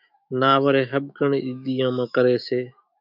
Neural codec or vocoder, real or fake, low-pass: autoencoder, 48 kHz, 128 numbers a frame, DAC-VAE, trained on Japanese speech; fake; 5.4 kHz